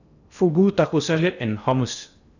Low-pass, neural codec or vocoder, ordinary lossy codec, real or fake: 7.2 kHz; codec, 16 kHz in and 24 kHz out, 0.8 kbps, FocalCodec, streaming, 65536 codes; none; fake